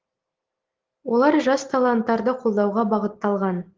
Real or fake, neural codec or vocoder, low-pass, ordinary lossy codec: real; none; 7.2 kHz; Opus, 16 kbps